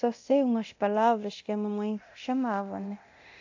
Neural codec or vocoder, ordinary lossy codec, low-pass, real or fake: codec, 24 kHz, 0.9 kbps, DualCodec; AAC, 48 kbps; 7.2 kHz; fake